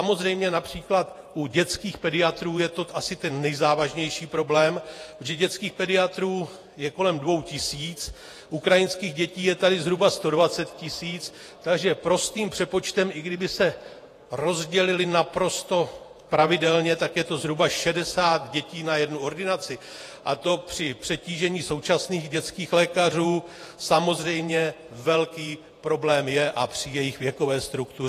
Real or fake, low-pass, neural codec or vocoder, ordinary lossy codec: fake; 14.4 kHz; vocoder, 48 kHz, 128 mel bands, Vocos; AAC, 48 kbps